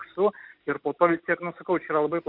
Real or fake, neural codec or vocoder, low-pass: real; none; 5.4 kHz